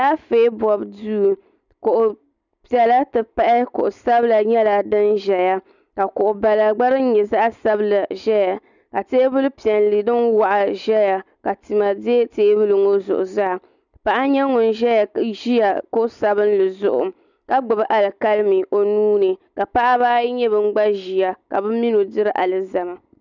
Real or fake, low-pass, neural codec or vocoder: real; 7.2 kHz; none